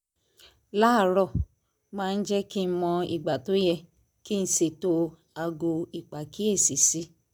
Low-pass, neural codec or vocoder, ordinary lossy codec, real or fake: none; none; none; real